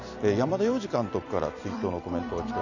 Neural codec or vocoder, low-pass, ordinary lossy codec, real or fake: vocoder, 44.1 kHz, 128 mel bands every 256 samples, BigVGAN v2; 7.2 kHz; none; fake